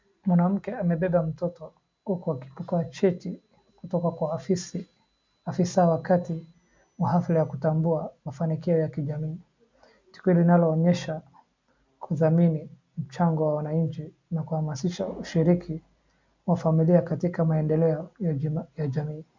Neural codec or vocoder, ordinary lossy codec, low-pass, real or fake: none; MP3, 64 kbps; 7.2 kHz; real